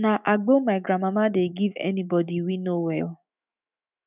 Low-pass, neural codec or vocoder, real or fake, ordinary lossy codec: 3.6 kHz; autoencoder, 48 kHz, 128 numbers a frame, DAC-VAE, trained on Japanese speech; fake; none